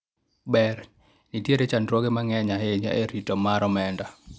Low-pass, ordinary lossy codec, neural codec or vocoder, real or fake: none; none; none; real